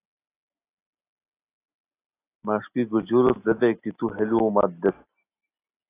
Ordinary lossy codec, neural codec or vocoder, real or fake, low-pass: AAC, 24 kbps; none; real; 3.6 kHz